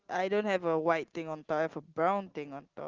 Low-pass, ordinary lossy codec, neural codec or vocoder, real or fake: 7.2 kHz; Opus, 16 kbps; none; real